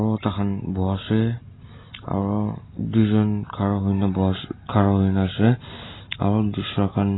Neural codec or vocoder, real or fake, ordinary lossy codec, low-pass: none; real; AAC, 16 kbps; 7.2 kHz